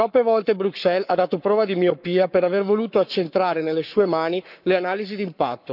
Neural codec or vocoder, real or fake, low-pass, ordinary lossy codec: codec, 44.1 kHz, 7.8 kbps, Pupu-Codec; fake; 5.4 kHz; none